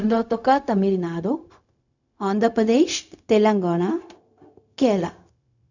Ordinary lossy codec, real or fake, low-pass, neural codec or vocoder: none; fake; 7.2 kHz; codec, 16 kHz, 0.4 kbps, LongCat-Audio-Codec